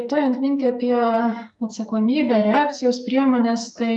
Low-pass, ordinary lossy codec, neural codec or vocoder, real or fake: 10.8 kHz; MP3, 96 kbps; codec, 44.1 kHz, 2.6 kbps, SNAC; fake